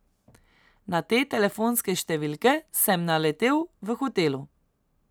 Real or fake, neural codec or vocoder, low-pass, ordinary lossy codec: real; none; none; none